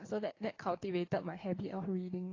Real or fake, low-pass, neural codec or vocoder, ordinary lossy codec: fake; 7.2 kHz; codec, 44.1 kHz, 7.8 kbps, DAC; AAC, 32 kbps